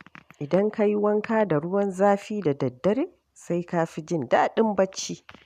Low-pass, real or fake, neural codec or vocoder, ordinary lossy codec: 14.4 kHz; real; none; none